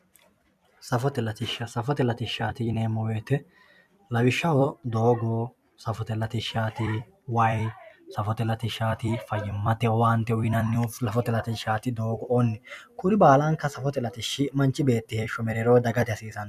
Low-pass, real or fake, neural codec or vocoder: 14.4 kHz; fake; vocoder, 44.1 kHz, 128 mel bands every 256 samples, BigVGAN v2